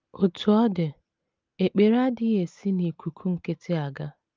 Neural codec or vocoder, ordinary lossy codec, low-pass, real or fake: none; Opus, 24 kbps; 7.2 kHz; real